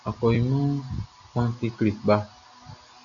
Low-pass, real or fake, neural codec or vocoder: 7.2 kHz; real; none